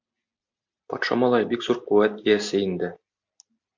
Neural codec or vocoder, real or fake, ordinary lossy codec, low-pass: none; real; MP3, 64 kbps; 7.2 kHz